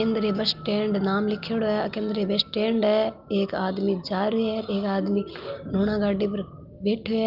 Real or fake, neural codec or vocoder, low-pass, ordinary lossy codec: real; none; 5.4 kHz; Opus, 32 kbps